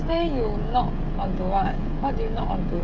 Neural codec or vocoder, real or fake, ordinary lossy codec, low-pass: codec, 16 kHz, 16 kbps, FreqCodec, smaller model; fake; MP3, 48 kbps; 7.2 kHz